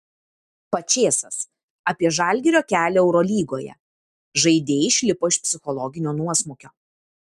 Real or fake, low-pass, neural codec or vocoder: real; 14.4 kHz; none